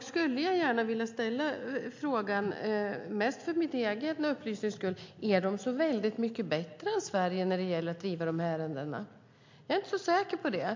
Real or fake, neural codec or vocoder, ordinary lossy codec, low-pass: real; none; MP3, 48 kbps; 7.2 kHz